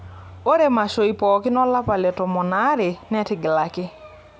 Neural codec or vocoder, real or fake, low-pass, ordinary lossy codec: none; real; none; none